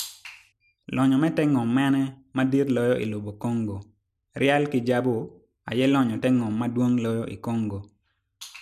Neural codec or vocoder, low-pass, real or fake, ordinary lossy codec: none; 14.4 kHz; real; none